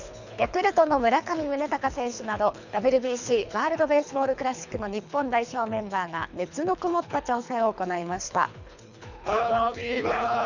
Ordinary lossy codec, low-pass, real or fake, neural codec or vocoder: none; 7.2 kHz; fake; codec, 24 kHz, 3 kbps, HILCodec